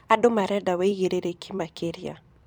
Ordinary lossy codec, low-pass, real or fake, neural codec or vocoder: none; 19.8 kHz; fake; vocoder, 44.1 kHz, 128 mel bands every 256 samples, BigVGAN v2